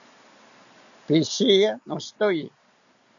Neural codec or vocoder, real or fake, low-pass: none; real; 7.2 kHz